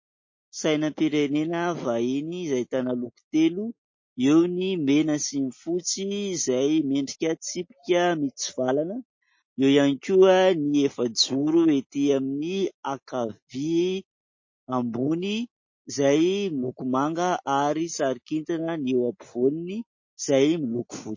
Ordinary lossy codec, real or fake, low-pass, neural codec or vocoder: MP3, 32 kbps; real; 7.2 kHz; none